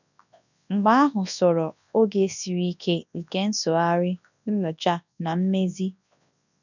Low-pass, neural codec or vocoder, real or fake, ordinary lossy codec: 7.2 kHz; codec, 24 kHz, 0.9 kbps, WavTokenizer, large speech release; fake; none